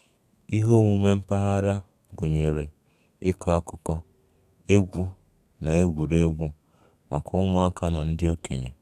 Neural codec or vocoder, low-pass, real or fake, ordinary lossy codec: codec, 32 kHz, 1.9 kbps, SNAC; 14.4 kHz; fake; none